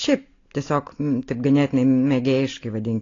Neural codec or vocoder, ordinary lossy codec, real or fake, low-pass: none; AAC, 32 kbps; real; 7.2 kHz